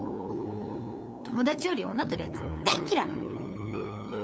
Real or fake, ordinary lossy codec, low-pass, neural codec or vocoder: fake; none; none; codec, 16 kHz, 8 kbps, FunCodec, trained on LibriTTS, 25 frames a second